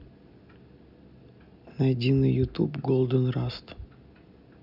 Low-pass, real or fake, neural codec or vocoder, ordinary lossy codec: 5.4 kHz; real; none; none